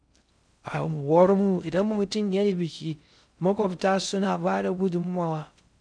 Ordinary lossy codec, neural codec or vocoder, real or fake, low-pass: none; codec, 16 kHz in and 24 kHz out, 0.6 kbps, FocalCodec, streaming, 2048 codes; fake; 9.9 kHz